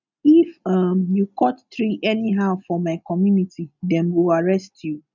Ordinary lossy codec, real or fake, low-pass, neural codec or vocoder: none; real; 7.2 kHz; none